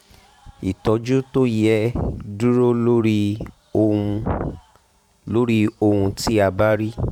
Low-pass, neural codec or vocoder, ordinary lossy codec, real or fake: 19.8 kHz; vocoder, 44.1 kHz, 128 mel bands every 512 samples, BigVGAN v2; none; fake